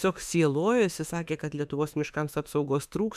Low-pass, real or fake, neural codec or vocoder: 14.4 kHz; fake; autoencoder, 48 kHz, 32 numbers a frame, DAC-VAE, trained on Japanese speech